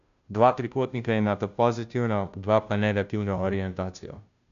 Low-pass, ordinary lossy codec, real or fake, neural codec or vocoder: 7.2 kHz; none; fake; codec, 16 kHz, 0.5 kbps, FunCodec, trained on Chinese and English, 25 frames a second